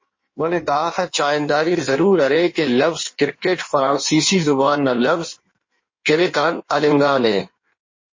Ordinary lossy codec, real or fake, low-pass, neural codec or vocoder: MP3, 32 kbps; fake; 7.2 kHz; codec, 16 kHz in and 24 kHz out, 1.1 kbps, FireRedTTS-2 codec